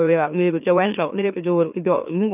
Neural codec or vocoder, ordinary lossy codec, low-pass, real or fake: autoencoder, 44.1 kHz, a latent of 192 numbers a frame, MeloTTS; none; 3.6 kHz; fake